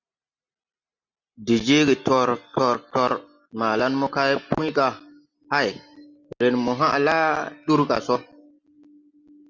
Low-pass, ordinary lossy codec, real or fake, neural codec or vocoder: 7.2 kHz; Opus, 64 kbps; real; none